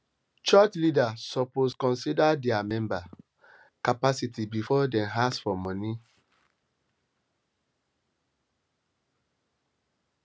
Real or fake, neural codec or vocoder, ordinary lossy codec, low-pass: real; none; none; none